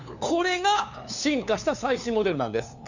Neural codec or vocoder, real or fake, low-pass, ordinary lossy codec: codec, 16 kHz, 2 kbps, FunCodec, trained on LibriTTS, 25 frames a second; fake; 7.2 kHz; none